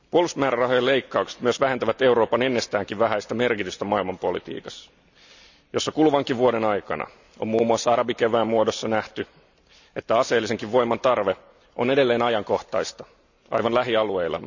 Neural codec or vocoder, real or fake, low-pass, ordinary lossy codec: none; real; 7.2 kHz; none